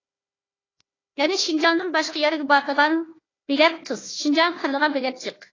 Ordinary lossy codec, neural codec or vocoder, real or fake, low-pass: AAC, 32 kbps; codec, 16 kHz, 1 kbps, FunCodec, trained on Chinese and English, 50 frames a second; fake; 7.2 kHz